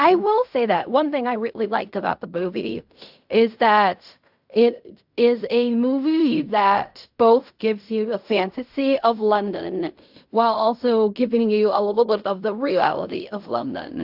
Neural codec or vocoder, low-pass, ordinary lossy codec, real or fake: codec, 16 kHz in and 24 kHz out, 0.4 kbps, LongCat-Audio-Codec, fine tuned four codebook decoder; 5.4 kHz; AAC, 48 kbps; fake